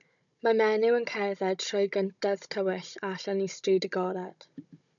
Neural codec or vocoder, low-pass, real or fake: codec, 16 kHz, 16 kbps, FunCodec, trained on Chinese and English, 50 frames a second; 7.2 kHz; fake